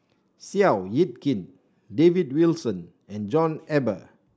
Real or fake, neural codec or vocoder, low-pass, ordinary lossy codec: real; none; none; none